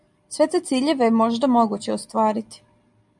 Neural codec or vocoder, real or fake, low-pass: none; real; 10.8 kHz